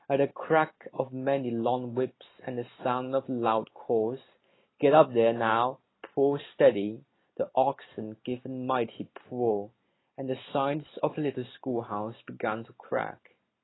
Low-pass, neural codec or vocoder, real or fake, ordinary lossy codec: 7.2 kHz; none; real; AAC, 16 kbps